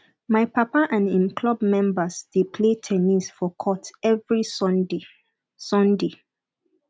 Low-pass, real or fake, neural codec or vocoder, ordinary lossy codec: none; real; none; none